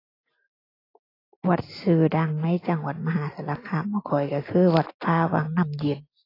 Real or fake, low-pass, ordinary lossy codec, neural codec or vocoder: real; 5.4 kHz; AAC, 24 kbps; none